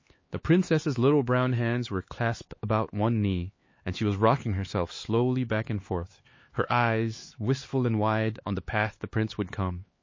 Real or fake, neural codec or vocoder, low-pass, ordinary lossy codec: fake; codec, 16 kHz, 4 kbps, X-Codec, HuBERT features, trained on LibriSpeech; 7.2 kHz; MP3, 32 kbps